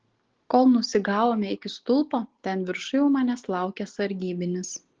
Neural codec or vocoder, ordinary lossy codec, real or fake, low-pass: none; Opus, 16 kbps; real; 7.2 kHz